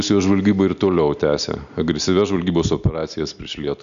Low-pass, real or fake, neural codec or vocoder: 7.2 kHz; real; none